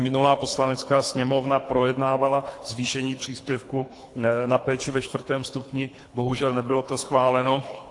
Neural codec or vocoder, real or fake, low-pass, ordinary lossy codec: codec, 24 kHz, 3 kbps, HILCodec; fake; 10.8 kHz; AAC, 48 kbps